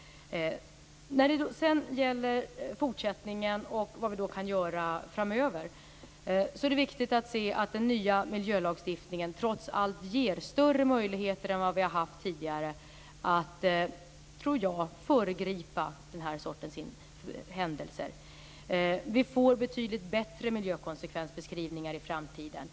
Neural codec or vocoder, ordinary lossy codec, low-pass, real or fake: none; none; none; real